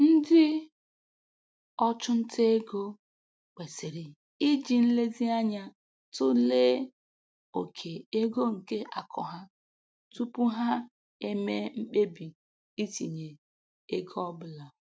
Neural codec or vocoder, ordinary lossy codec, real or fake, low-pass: none; none; real; none